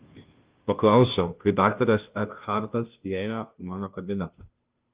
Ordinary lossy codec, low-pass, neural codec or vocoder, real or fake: Opus, 32 kbps; 3.6 kHz; codec, 16 kHz, 0.5 kbps, FunCodec, trained on Chinese and English, 25 frames a second; fake